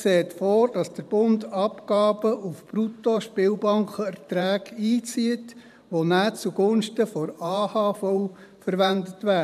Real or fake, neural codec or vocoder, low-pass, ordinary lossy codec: fake; vocoder, 44.1 kHz, 128 mel bands every 512 samples, BigVGAN v2; 14.4 kHz; none